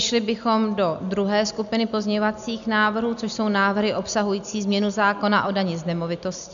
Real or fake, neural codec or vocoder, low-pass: real; none; 7.2 kHz